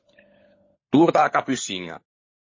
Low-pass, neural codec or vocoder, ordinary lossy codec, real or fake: 7.2 kHz; codec, 16 kHz, 16 kbps, FunCodec, trained on LibriTTS, 50 frames a second; MP3, 32 kbps; fake